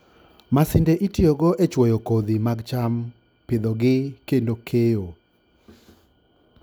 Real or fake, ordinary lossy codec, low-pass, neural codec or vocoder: real; none; none; none